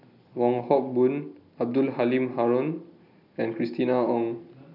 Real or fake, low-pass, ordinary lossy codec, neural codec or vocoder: real; 5.4 kHz; none; none